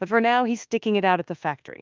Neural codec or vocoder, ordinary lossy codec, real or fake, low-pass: codec, 24 kHz, 1.2 kbps, DualCodec; Opus, 24 kbps; fake; 7.2 kHz